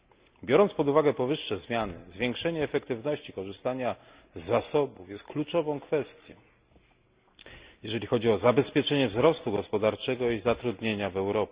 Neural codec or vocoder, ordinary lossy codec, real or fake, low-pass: none; Opus, 64 kbps; real; 3.6 kHz